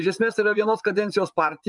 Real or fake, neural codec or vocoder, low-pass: fake; vocoder, 24 kHz, 100 mel bands, Vocos; 10.8 kHz